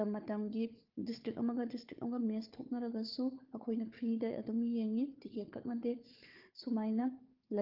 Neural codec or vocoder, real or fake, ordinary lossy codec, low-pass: codec, 16 kHz, 4 kbps, FunCodec, trained on Chinese and English, 50 frames a second; fake; Opus, 32 kbps; 5.4 kHz